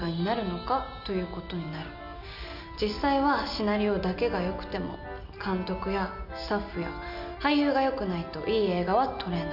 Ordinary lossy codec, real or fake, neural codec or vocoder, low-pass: none; real; none; 5.4 kHz